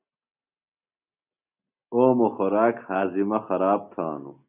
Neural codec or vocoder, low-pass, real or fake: none; 3.6 kHz; real